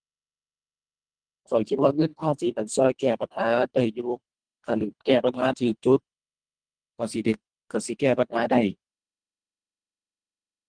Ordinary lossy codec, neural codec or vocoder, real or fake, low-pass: Opus, 24 kbps; codec, 24 kHz, 1.5 kbps, HILCodec; fake; 9.9 kHz